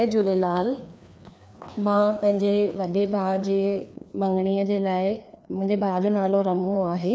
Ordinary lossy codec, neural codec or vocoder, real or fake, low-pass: none; codec, 16 kHz, 2 kbps, FreqCodec, larger model; fake; none